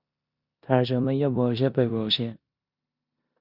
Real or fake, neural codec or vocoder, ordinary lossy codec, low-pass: fake; codec, 16 kHz in and 24 kHz out, 0.9 kbps, LongCat-Audio-Codec, four codebook decoder; Opus, 64 kbps; 5.4 kHz